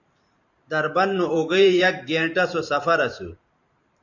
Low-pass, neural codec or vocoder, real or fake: 7.2 kHz; vocoder, 44.1 kHz, 128 mel bands every 512 samples, BigVGAN v2; fake